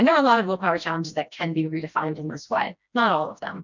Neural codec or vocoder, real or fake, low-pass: codec, 16 kHz, 1 kbps, FreqCodec, smaller model; fake; 7.2 kHz